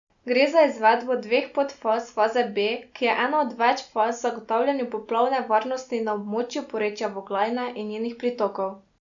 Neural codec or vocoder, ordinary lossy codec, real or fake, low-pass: none; none; real; 7.2 kHz